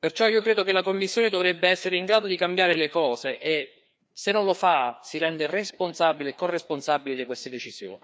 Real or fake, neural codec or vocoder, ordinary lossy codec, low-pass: fake; codec, 16 kHz, 2 kbps, FreqCodec, larger model; none; none